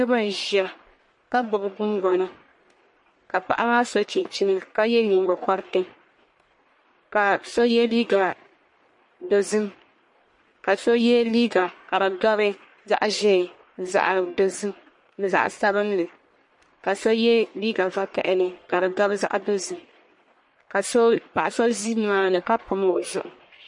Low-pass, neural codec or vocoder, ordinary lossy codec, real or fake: 10.8 kHz; codec, 44.1 kHz, 1.7 kbps, Pupu-Codec; MP3, 48 kbps; fake